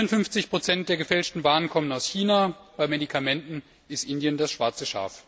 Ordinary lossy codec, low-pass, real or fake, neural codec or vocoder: none; none; real; none